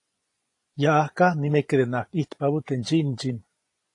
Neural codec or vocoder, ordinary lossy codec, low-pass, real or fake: vocoder, 24 kHz, 100 mel bands, Vocos; AAC, 48 kbps; 10.8 kHz; fake